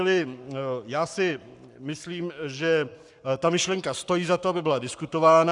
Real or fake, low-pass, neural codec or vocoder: fake; 10.8 kHz; codec, 44.1 kHz, 7.8 kbps, Pupu-Codec